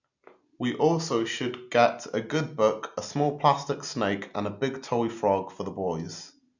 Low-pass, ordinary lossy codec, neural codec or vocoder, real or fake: 7.2 kHz; none; none; real